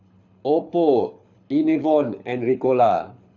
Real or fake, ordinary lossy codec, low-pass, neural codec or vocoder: fake; none; 7.2 kHz; codec, 24 kHz, 6 kbps, HILCodec